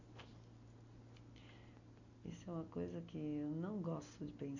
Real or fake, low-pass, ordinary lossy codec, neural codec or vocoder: real; 7.2 kHz; none; none